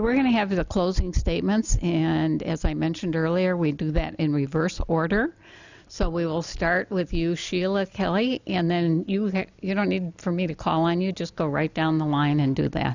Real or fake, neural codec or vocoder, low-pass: real; none; 7.2 kHz